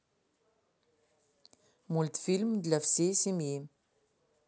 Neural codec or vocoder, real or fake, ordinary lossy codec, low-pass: none; real; none; none